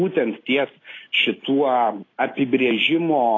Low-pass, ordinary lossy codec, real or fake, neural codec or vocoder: 7.2 kHz; AAC, 32 kbps; real; none